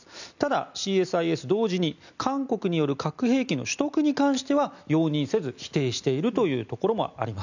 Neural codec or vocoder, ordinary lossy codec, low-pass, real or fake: none; none; 7.2 kHz; real